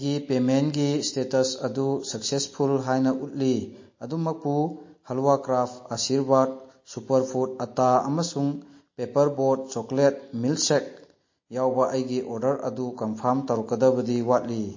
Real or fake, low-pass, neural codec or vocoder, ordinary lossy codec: real; 7.2 kHz; none; MP3, 32 kbps